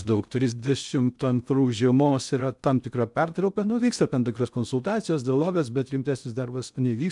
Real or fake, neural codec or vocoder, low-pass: fake; codec, 16 kHz in and 24 kHz out, 0.6 kbps, FocalCodec, streaming, 2048 codes; 10.8 kHz